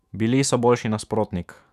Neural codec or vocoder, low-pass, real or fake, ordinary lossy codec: autoencoder, 48 kHz, 128 numbers a frame, DAC-VAE, trained on Japanese speech; 14.4 kHz; fake; none